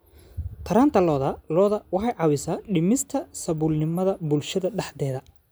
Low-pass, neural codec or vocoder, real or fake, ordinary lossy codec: none; none; real; none